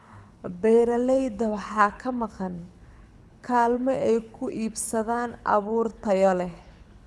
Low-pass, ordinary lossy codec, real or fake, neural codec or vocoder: none; none; fake; codec, 24 kHz, 6 kbps, HILCodec